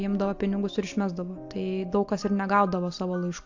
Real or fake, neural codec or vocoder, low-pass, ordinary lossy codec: real; none; 7.2 kHz; AAC, 48 kbps